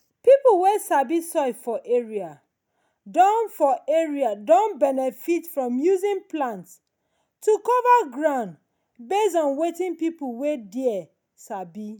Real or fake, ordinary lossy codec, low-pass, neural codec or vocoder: real; none; none; none